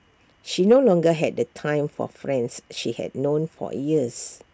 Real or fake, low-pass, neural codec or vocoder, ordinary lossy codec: real; none; none; none